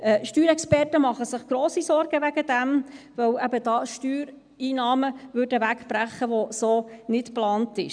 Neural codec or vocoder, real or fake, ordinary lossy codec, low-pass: none; real; none; 9.9 kHz